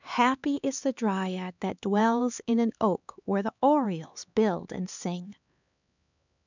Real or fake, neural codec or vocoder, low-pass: fake; codec, 16 kHz, 4 kbps, X-Codec, HuBERT features, trained on LibriSpeech; 7.2 kHz